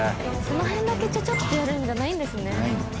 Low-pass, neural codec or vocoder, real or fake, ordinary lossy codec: none; none; real; none